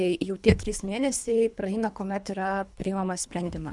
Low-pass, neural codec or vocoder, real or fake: 10.8 kHz; codec, 24 kHz, 3 kbps, HILCodec; fake